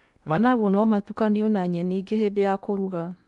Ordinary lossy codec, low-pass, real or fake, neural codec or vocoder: none; 10.8 kHz; fake; codec, 16 kHz in and 24 kHz out, 0.8 kbps, FocalCodec, streaming, 65536 codes